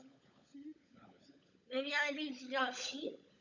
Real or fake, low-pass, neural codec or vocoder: fake; 7.2 kHz; codec, 16 kHz, 16 kbps, FunCodec, trained on LibriTTS, 50 frames a second